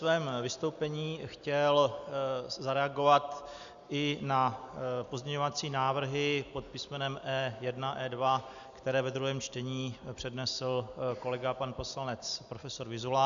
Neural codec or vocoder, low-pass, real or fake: none; 7.2 kHz; real